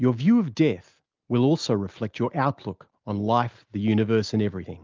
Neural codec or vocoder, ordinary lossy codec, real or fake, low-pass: none; Opus, 32 kbps; real; 7.2 kHz